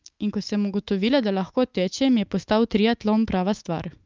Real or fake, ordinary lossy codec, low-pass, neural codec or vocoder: fake; Opus, 24 kbps; 7.2 kHz; autoencoder, 48 kHz, 128 numbers a frame, DAC-VAE, trained on Japanese speech